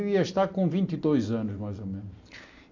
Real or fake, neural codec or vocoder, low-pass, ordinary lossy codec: real; none; 7.2 kHz; AAC, 48 kbps